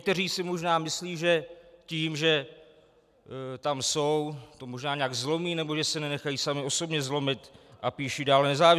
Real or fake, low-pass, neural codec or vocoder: real; 14.4 kHz; none